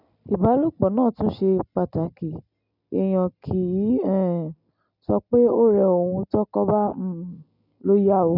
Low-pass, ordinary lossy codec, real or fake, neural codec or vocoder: 5.4 kHz; none; real; none